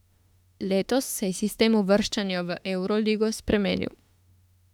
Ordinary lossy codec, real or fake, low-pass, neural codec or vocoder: none; fake; 19.8 kHz; autoencoder, 48 kHz, 32 numbers a frame, DAC-VAE, trained on Japanese speech